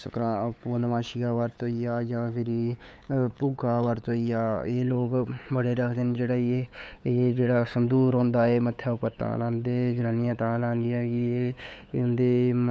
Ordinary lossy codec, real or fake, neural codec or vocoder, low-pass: none; fake; codec, 16 kHz, 8 kbps, FunCodec, trained on LibriTTS, 25 frames a second; none